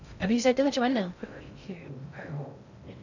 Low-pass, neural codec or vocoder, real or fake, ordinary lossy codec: 7.2 kHz; codec, 16 kHz in and 24 kHz out, 0.6 kbps, FocalCodec, streaming, 2048 codes; fake; none